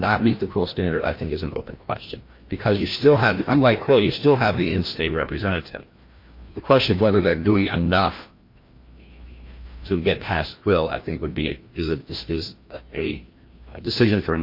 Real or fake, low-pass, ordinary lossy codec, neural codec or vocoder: fake; 5.4 kHz; MP3, 32 kbps; codec, 16 kHz, 1 kbps, FreqCodec, larger model